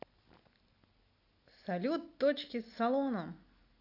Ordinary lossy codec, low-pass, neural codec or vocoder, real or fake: AAC, 32 kbps; 5.4 kHz; none; real